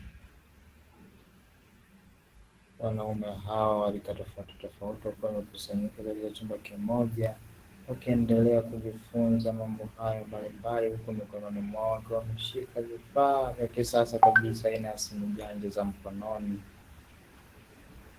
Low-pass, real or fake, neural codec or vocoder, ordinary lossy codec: 14.4 kHz; fake; codec, 44.1 kHz, 7.8 kbps, DAC; Opus, 16 kbps